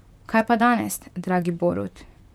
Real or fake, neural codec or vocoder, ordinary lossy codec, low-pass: fake; vocoder, 44.1 kHz, 128 mel bands, Pupu-Vocoder; none; 19.8 kHz